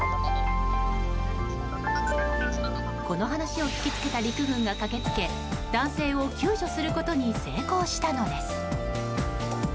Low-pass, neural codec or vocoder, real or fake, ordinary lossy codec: none; none; real; none